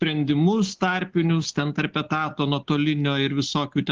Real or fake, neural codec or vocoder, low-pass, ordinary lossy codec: real; none; 7.2 kHz; Opus, 16 kbps